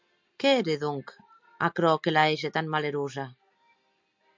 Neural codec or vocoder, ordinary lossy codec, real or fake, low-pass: none; MP3, 64 kbps; real; 7.2 kHz